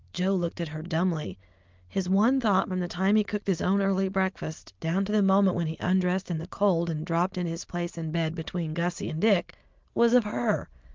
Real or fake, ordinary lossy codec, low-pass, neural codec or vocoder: real; Opus, 24 kbps; 7.2 kHz; none